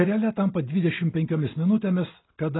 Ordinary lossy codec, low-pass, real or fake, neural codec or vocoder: AAC, 16 kbps; 7.2 kHz; real; none